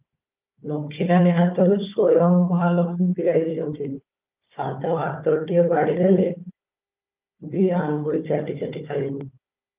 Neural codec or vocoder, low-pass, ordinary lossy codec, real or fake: codec, 16 kHz, 4 kbps, FunCodec, trained on Chinese and English, 50 frames a second; 3.6 kHz; Opus, 24 kbps; fake